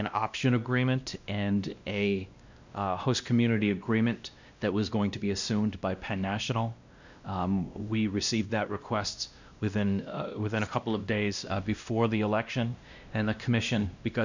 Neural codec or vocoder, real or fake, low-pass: codec, 16 kHz, 1 kbps, X-Codec, WavLM features, trained on Multilingual LibriSpeech; fake; 7.2 kHz